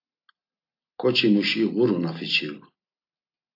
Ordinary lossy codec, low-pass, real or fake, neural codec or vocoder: AAC, 32 kbps; 5.4 kHz; real; none